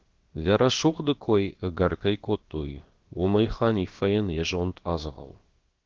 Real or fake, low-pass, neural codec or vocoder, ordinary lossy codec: fake; 7.2 kHz; codec, 16 kHz, about 1 kbps, DyCAST, with the encoder's durations; Opus, 16 kbps